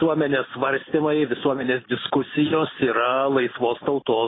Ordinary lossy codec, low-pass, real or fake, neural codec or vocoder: AAC, 16 kbps; 7.2 kHz; real; none